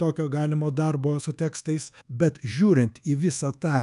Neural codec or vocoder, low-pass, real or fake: codec, 24 kHz, 1.2 kbps, DualCodec; 10.8 kHz; fake